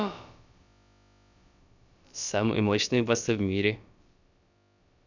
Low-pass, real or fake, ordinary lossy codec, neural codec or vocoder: 7.2 kHz; fake; none; codec, 16 kHz, about 1 kbps, DyCAST, with the encoder's durations